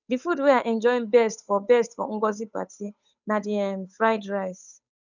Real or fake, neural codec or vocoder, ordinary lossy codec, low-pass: fake; codec, 16 kHz, 8 kbps, FunCodec, trained on Chinese and English, 25 frames a second; none; 7.2 kHz